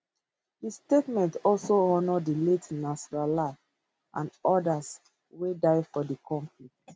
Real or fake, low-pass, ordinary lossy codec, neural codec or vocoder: real; none; none; none